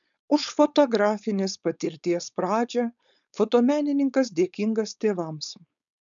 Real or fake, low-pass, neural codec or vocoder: fake; 7.2 kHz; codec, 16 kHz, 4.8 kbps, FACodec